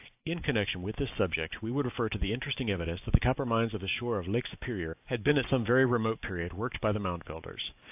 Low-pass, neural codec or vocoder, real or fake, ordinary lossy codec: 3.6 kHz; none; real; AAC, 32 kbps